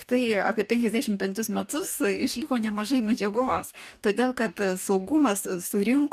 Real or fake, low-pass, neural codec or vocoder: fake; 14.4 kHz; codec, 44.1 kHz, 2.6 kbps, DAC